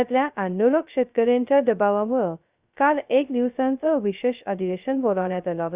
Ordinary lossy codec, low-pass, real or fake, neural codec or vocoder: Opus, 64 kbps; 3.6 kHz; fake; codec, 16 kHz, 0.2 kbps, FocalCodec